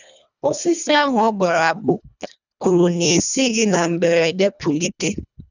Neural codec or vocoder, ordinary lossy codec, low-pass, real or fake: codec, 24 kHz, 1.5 kbps, HILCodec; none; 7.2 kHz; fake